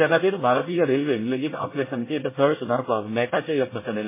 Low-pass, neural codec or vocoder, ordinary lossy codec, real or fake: 3.6 kHz; codec, 24 kHz, 1 kbps, SNAC; MP3, 16 kbps; fake